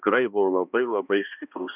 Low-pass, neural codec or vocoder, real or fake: 3.6 kHz; codec, 16 kHz, 1 kbps, X-Codec, HuBERT features, trained on balanced general audio; fake